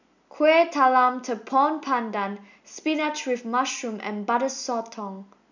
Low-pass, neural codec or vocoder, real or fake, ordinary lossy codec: 7.2 kHz; none; real; none